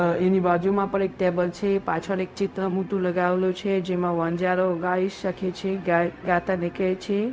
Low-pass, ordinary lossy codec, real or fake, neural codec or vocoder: none; none; fake; codec, 16 kHz, 0.4 kbps, LongCat-Audio-Codec